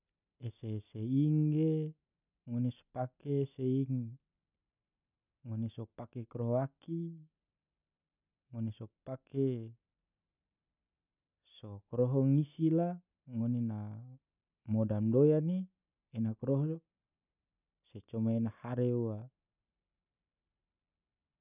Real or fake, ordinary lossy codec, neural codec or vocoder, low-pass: real; none; none; 3.6 kHz